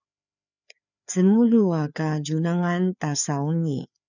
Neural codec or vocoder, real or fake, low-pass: codec, 16 kHz, 4 kbps, FreqCodec, larger model; fake; 7.2 kHz